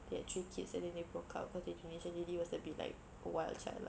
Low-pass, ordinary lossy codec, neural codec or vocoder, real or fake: none; none; none; real